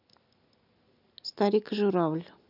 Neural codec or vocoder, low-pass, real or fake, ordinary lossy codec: none; 5.4 kHz; real; none